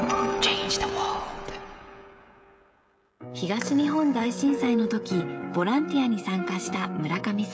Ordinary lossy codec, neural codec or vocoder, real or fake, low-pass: none; codec, 16 kHz, 16 kbps, FreqCodec, smaller model; fake; none